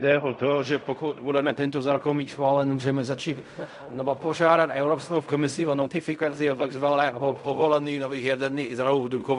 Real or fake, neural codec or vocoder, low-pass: fake; codec, 16 kHz in and 24 kHz out, 0.4 kbps, LongCat-Audio-Codec, fine tuned four codebook decoder; 10.8 kHz